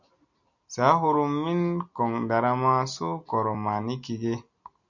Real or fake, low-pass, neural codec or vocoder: real; 7.2 kHz; none